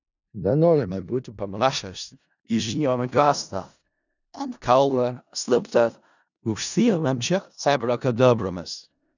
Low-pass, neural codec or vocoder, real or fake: 7.2 kHz; codec, 16 kHz in and 24 kHz out, 0.4 kbps, LongCat-Audio-Codec, four codebook decoder; fake